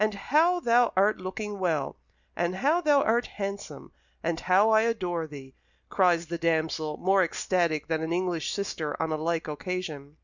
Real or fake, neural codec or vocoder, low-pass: real; none; 7.2 kHz